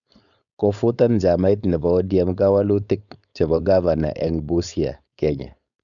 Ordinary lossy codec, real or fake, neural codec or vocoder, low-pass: none; fake; codec, 16 kHz, 4.8 kbps, FACodec; 7.2 kHz